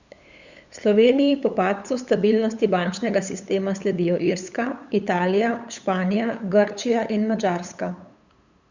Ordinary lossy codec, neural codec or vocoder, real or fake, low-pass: Opus, 64 kbps; codec, 16 kHz, 8 kbps, FunCodec, trained on LibriTTS, 25 frames a second; fake; 7.2 kHz